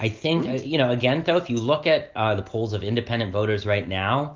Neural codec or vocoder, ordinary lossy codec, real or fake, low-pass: none; Opus, 32 kbps; real; 7.2 kHz